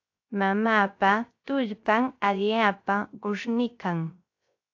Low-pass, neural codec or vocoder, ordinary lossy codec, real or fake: 7.2 kHz; codec, 16 kHz, 0.3 kbps, FocalCodec; AAC, 48 kbps; fake